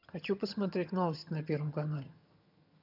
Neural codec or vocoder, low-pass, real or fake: vocoder, 22.05 kHz, 80 mel bands, HiFi-GAN; 5.4 kHz; fake